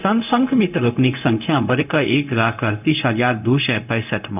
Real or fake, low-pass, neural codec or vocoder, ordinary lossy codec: fake; 3.6 kHz; codec, 16 kHz, 0.4 kbps, LongCat-Audio-Codec; none